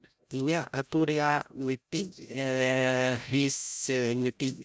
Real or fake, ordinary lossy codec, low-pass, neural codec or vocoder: fake; none; none; codec, 16 kHz, 0.5 kbps, FreqCodec, larger model